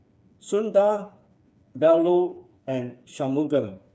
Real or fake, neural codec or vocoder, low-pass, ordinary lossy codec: fake; codec, 16 kHz, 4 kbps, FreqCodec, smaller model; none; none